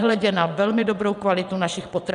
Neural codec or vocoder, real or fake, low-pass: vocoder, 22.05 kHz, 80 mel bands, WaveNeXt; fake; 9.9 kHz